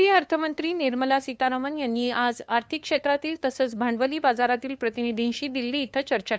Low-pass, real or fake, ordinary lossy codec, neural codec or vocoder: none; fake; none; codec, 16 kHz, 2 kbps, FunCodec, trained on LibriTTS, 25 frames a second